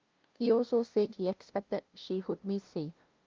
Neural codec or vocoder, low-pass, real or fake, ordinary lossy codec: codec, 16 kHz, 0.5 kbps, FunCodec, trained on LibriTTS, 25 frames a second; 7.2 kHz; fake; Opus, 24 kbps